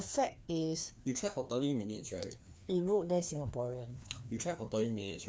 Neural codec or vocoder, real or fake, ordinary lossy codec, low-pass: codec, 16 kHz, 2 kbps, FreqCodec, larger model; fake; none; none